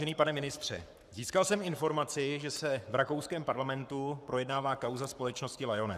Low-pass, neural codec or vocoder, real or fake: 14.4 kHz; codec, 44.1 kHz, 7.8 kbps, Pupu-Codec; fake